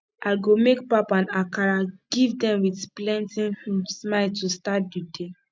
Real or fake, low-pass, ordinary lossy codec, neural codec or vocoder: real; none; none; none